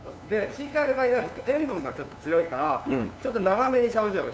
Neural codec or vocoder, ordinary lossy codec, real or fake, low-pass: codec, 16 kHz, 2 kbps, FunCodec, trained on LibriTTS, 25 frames a second; none; fake; none